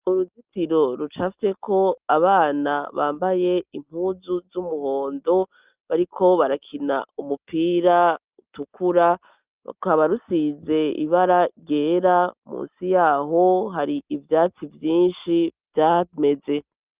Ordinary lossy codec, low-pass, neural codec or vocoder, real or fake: Opus, 32 kbps; 3.6 kHz; none; real